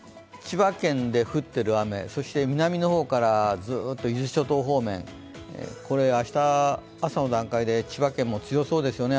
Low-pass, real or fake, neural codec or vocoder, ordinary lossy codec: none; real; none; none